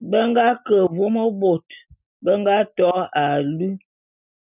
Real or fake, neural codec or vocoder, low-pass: real; none; 3.6 kHz